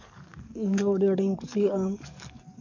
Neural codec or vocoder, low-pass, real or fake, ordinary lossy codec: codec, 44.1 kHz, 7.8 kbps, DAC; 7.2 kHz; fake; none